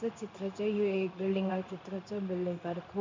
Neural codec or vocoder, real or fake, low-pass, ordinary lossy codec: codec, 16 kHz in and 24 kHz out, 1 kbps, XY-Tokenizer; fake; 7.2 kHz; MP3, 64 kbps